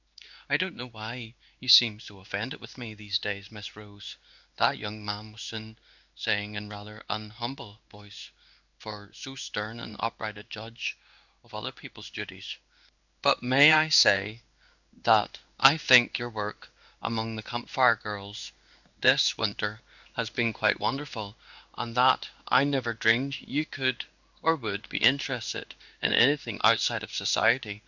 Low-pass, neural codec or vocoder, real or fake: 7.2 kHz; codec, 16 kHz in and 24 kHz out, 1 kbps, XY-Tokenizer; fake